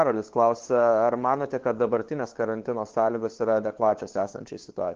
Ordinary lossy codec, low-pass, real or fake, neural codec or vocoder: Opus, 16 kbps; 7.2 kHz; fake; codec, 16 kHz, 2 kbps, FunCodec, trained on LibriTTS, 25 frames a second